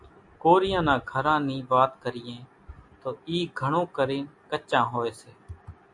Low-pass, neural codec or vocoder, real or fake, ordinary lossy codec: 10.8 kHz; none; real; AAC, 64 kbps